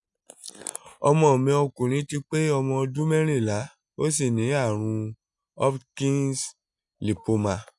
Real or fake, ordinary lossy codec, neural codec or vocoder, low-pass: real; none; none; 10.8 kHz